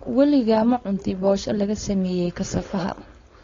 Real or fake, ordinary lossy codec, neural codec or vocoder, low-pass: fake; AAC, 32 kbps; codec, 16 kHz, 4.8 kbps, FACodec; 7.2 kHz